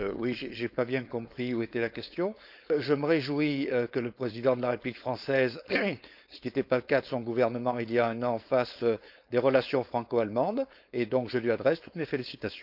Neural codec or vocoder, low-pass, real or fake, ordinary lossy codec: codec, 16 kHz, 4.8 kbps, FACodec; 5.4 kHz; fake; none